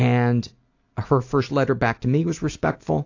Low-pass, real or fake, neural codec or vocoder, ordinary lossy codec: 7.2 kHz; real; none; AAC, 48 kbps